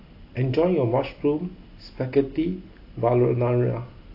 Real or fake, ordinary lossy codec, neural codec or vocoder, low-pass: real; AAC, 32 kbps; none; 5.4 kHz